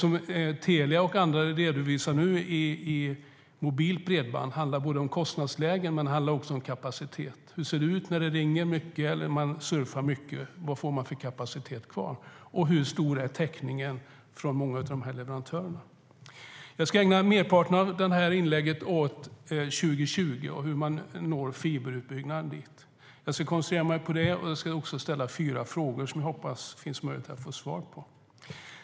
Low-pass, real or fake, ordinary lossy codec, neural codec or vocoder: none; real; none; none